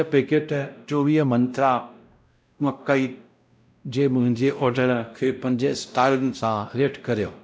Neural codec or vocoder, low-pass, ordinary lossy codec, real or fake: codec, 16 kHz, 0.5 kbps, X-Codec, WavLM features, trained on Multilingual LibriSpeech; none; none; fake